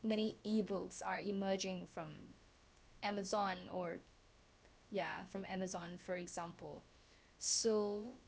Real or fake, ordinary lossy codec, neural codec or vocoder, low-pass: fake; none; codec, 16 kHz, about 1 kbps, DyCAST, with the encoder's durations; none